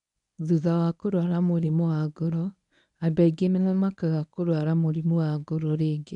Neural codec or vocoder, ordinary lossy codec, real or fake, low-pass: codec, 24 kHz, 0.9 kbps, WavTokenizer, medium speech release version 1; MP3, 96 kbps; fake; 10.8 kHz